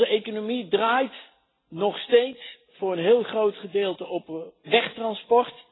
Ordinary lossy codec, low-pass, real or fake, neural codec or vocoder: AAC, 16 kbps; 7.2 kHz; real; none